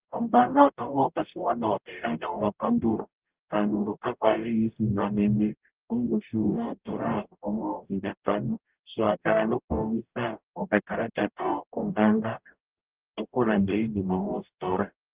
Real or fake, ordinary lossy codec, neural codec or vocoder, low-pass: fake; Opus, 32 kbps; codec, 44.1 kHz, 0.9 kbps, DAC; 3.6 kHz